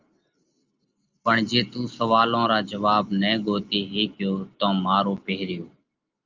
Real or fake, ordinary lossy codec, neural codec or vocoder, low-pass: real; Opus, 32 kbps; none; 7.2 kHz